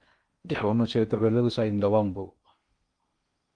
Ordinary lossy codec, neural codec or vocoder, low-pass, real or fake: Opus, 24 kbps; codec, 16 kHz in and 24 kHz out, 0.6 kbps, FocalCodec, streaming, 2048 codes; 9.9 kHz; fake